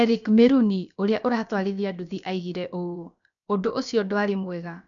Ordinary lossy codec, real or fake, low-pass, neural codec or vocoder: none; fake; 7.2 kHz; codec, 16 kHz, about 1 kbps, DyCAST, with the encoder's durations